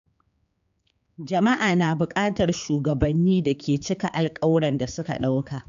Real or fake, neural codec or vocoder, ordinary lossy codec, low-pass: fake; codec, 16 kHz, 4 kbps, X-Codec, HuBERT features, trained on general audio; AAC, 96 kbps; 7.2 kHz